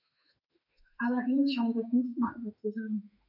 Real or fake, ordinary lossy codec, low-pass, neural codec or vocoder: fake; none; 5.4 kHz; autoencoder, 48 kHz, 32 numbers a frame, DAC-VAE, trained on Japanese speech